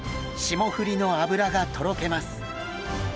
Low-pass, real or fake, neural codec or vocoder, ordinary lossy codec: none; real; none; none